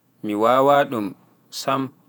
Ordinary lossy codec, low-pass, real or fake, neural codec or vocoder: none; none; fake; vocoder, 48 kHz, 128 mel bands, Vocos